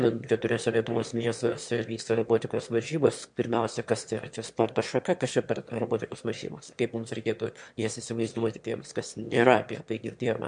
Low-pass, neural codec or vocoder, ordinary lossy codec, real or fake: 9.9 kHz; autoencoder, 22.05 kHz, a latent of 192 numbers a frame, VITS, trained on one speaker; MP3, 64 kbps; fake